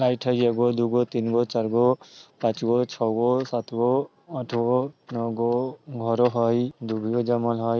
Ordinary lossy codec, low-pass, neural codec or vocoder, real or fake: none; none; none; real